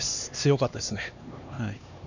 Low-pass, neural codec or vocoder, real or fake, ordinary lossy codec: 7.2 kHz; codec, 16 kHz, 2 kbps, X-Codec, HuBERT features, trained on LibriSpeech; fake; none